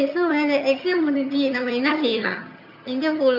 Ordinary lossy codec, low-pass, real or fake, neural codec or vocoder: none; 5.4 kHz; fake; vocoder, 22.05 kHz, 80 mel bands, HiFi-GAN